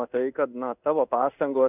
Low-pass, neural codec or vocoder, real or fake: 3.6 kHz; codec, 16 kHz in and 24 kHz out, 1 kbps, XY-Tokenizer; fake